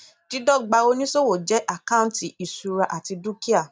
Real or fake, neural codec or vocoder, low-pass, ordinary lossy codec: real; none; none; none